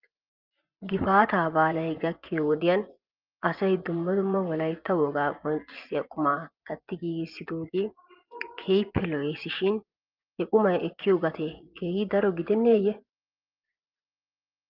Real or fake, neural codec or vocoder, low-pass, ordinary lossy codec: fake; vocoder, 24 kHz, 100 mel bands, Vocos; 5.4 kHz; Opus, 24 kbps